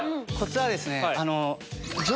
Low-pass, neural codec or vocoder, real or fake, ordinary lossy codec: none; none; real; none